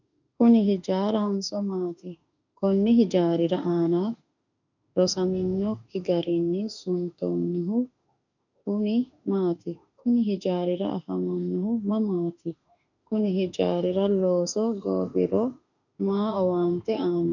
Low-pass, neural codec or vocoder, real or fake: 7.2 kHz; autoencoder, 48 kHz, 32 numbers a frame, DAC-VAE, trained on Japanese speech; fake